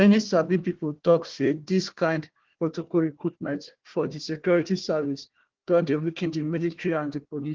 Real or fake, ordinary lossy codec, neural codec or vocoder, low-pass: fake; Opus, 16 kbps; codec, 16 kHz, 1 kbps, FunCodec, trained on Chinese and English, 50 frames a second; 7.2 kHz